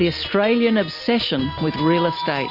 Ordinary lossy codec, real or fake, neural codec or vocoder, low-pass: MP3, 48 kbps; real; none; 5.4 kHz